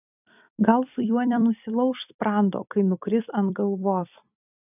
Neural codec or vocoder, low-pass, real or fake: vocoder, 44.1 kHz, 128 mel bands every 256 samples, BigVGAN v2; 3.6 kHz; fake